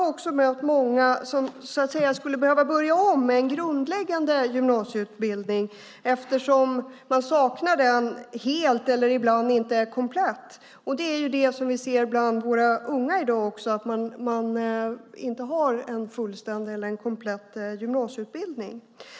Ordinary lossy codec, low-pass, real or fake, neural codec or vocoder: none; none; real; none